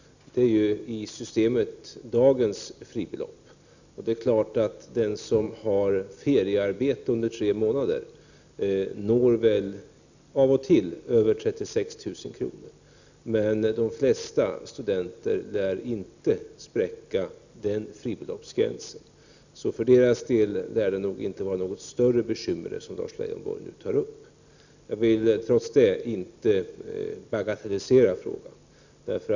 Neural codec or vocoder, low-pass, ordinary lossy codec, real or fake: none; 7.2 kHz; none; real